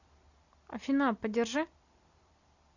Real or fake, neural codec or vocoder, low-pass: real; none; 7.2 kHz